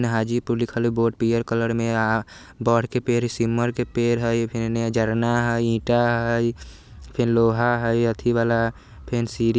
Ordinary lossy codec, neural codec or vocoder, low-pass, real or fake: none; none; none; real